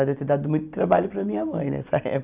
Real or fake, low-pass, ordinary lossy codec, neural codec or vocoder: real; 3.6 kHz; none; none